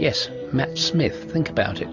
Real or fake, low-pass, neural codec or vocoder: real; 7.2 kHz; none